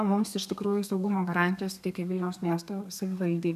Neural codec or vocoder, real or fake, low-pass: codec, 32 kHz, 1.9 kbps, SNAC; fake; 14.4 kHz